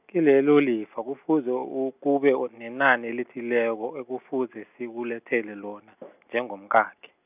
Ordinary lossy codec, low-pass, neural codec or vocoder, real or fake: none; 3.6 kHz; none; real